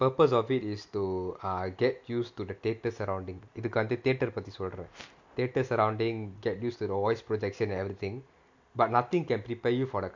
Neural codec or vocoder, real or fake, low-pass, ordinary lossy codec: none; real; 7.2 kHz; MP3, 48 kbps